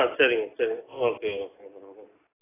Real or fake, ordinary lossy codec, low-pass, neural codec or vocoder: real; AAC, 16 kbps; 3.6 kHz; none